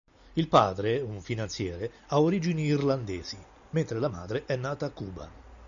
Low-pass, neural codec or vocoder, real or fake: 7.2 kHz; none; real